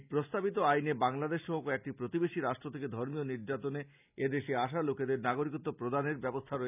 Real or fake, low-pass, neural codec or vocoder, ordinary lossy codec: real; 3.6 kHz; none; none